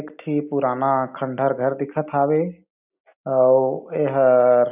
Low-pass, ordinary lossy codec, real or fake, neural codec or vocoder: 3.6 kHz; none; real; none